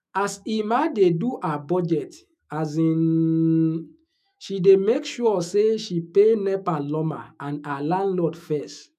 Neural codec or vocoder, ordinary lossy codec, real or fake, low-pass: autoencoder, 48 kHz, 128 numbers a frame, DAC-VAE, trained on Japanese speech; none; fake; 14.4 kHz